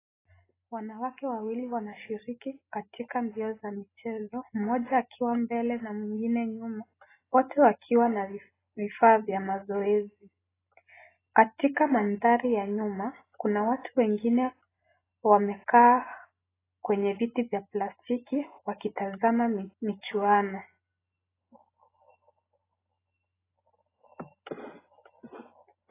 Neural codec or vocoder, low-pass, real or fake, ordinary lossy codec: none; 3.6 kHz; real; AAC, 16 kbps